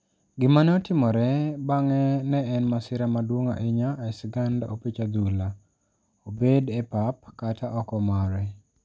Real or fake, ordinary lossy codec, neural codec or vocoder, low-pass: real; none; none; none